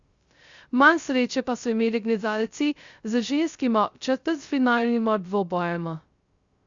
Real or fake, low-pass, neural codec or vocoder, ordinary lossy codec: fake; 7.2 kHz; codec, 16 kHz, 0.2 kbps, FocalCodec; Opus, 64 kbps